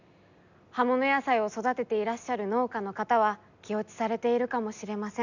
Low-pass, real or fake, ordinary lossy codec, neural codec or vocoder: 7.2 kHz; real; none; none